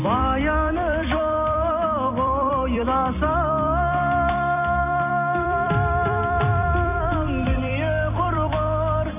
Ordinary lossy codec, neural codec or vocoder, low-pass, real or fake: none; vocoder, 44.1 kHz, 128 mel bands every 256 samples, BigVGAN v2; 3.6 kHz; fake